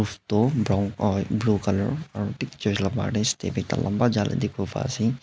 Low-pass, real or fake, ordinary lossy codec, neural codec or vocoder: none; real; none; none